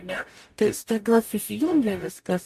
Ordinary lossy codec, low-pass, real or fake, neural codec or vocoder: MP3, 64 kbps; 14.4 kHz; fake; codec, 44.1 kHz, 0.9 kbps, DAC